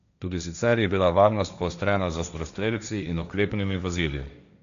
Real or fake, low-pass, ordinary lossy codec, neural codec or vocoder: fake; 7.2 kHz; none; codec, 16 kHz, 1.1 kbps, Voila-Tokenizer